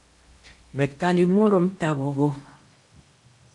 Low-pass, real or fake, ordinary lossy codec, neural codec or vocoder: 10.8 kHz; fake; AAC, 64 kbps; codec, 16 kHz in and 24 kHz out, 0.8 kbps, FocalCodec, streaming, 65536 codes